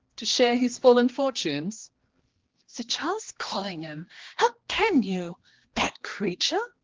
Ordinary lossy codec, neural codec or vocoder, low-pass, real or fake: Opus, 16 kbps; codec, 16 kHz, 2 kbps, X-Codec, HuBERT features, trained on general audio; 7.2 kHz; fake